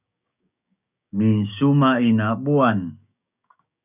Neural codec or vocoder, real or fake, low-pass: codec, 16 kHz, 6 kbps, DAC; fake; 3.6 kHz